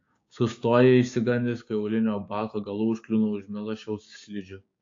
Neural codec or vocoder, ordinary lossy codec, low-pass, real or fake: codec, 16 kHz, 6 kbps, DAC; AAC, 48 kbps; 7.2 kHz; fake